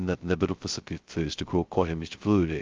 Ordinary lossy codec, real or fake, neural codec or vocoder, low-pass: Opus, 24 kbps; fake; codec, 16 kHz, 0.2 kbps, FocalCodec; 7.2 kHz